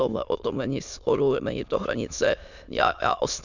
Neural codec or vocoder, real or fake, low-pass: autoencoder, 22.05 kHz, a latent of 192 numbers a frame, VITS, trained on many speakers; fake; 7.2 kHz